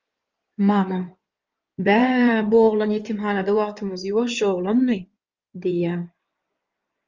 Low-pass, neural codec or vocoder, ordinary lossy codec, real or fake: 7.2 kHz; codec, 16 kHz in and 24 kHz out, 2.2 kbps, FireRedTTS-2 codec; Opus, 32 kbps; fake